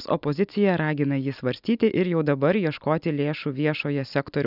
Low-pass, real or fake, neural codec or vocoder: 5.4 kHz; real; none